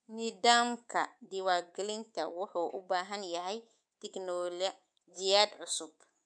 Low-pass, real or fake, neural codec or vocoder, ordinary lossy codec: 9.9 kHz; fake; codec, 24 kHz, 3.1 kbps, DualCodec; none